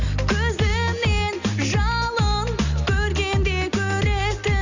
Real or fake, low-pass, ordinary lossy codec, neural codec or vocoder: real; 7.2 kHz; Opus, 64 kbps; none